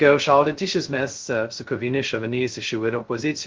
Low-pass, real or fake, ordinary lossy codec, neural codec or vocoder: 7.2 kHz; fake; Opus, 16 kbps; codec, 16 kHz, 0.2 kbps, FocalCodec